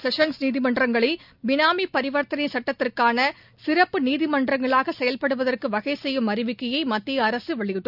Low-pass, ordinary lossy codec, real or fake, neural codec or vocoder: 5.4 kHz; none; real; none